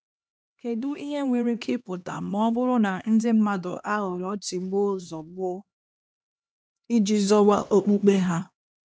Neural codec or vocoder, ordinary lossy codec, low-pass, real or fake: codec, 16 kHz, 2 kbps, X-Codec, HuBERT features, trained on LibriSpeech; none; none; fake